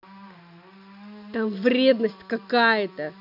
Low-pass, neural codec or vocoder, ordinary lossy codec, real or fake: 5.4 kHz; none; none; real